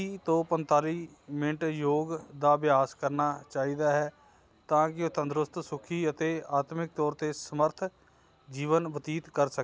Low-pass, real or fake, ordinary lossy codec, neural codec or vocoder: none; real; none; none